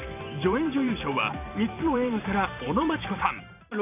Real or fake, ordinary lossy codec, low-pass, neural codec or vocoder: fake; none; 3.6 kHz; vocoder, 44.1 kHz, 128 mel bands every 512 samples, BigVGAN v2